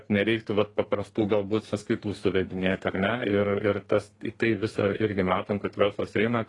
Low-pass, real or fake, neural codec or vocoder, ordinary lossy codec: 10.8 kHz; fake; codec, 32 kHz, 1.9 kbps, SNAC; AAC, 32 kbps